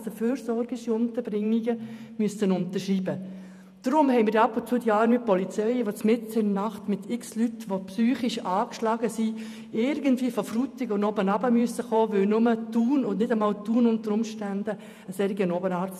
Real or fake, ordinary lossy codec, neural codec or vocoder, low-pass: fake; none; vocoder, 48 kHz, 128 mel bands, Vocos; 14.4 kHz